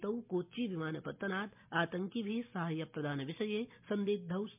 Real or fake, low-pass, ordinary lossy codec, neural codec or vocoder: real; 3.6 kHz; MP3, 32 kbps; none